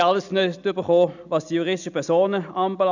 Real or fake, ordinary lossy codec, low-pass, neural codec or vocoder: real; none; 7.2 kHz; none